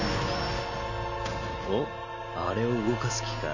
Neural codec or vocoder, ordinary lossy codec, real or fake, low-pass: none; none; real; 7.2 kHz